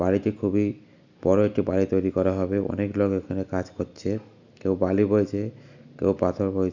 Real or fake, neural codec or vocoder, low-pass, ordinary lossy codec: real; none; 7.2 kHz; none